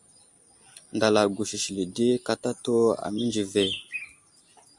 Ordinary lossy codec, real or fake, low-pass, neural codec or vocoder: Opus, 64 kbps; real; 10.8 kHz; none